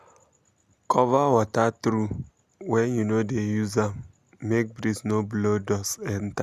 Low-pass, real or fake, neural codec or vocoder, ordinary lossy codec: 14.4 kHz; real; none; Opus, 64 kbps